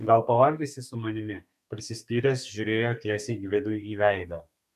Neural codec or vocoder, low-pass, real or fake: codec, 32 kHz, 1.9 kbps, SNAC; 14.4 kHz; fake